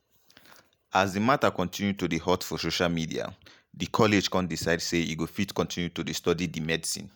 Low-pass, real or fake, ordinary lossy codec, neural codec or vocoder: none; real; none; none